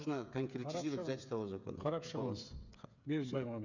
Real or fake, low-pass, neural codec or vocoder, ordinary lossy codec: fake; 7.2 kHz; codec, 16 kHz, 8 kbps, FreqCodec, smaller model; none